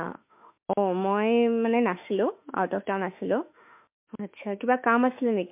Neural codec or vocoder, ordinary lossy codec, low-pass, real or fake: autoencoder, 48 kHz, 32 numbers a frame, DAC-VAE, trained on Japanese speech; MP3, 32 kbps; 3.6 kHz; fake